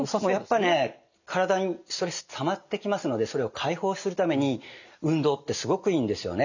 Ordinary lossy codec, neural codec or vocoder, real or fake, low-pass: none; none; real; 7.2 kHz